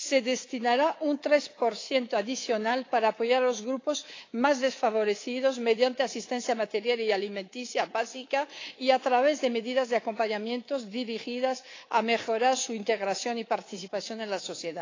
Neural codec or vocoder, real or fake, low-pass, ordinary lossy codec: codec, 24 kHz, 3.1 kbps, DualCodec; fake; 7.2 kHz; AAC, 32 kbps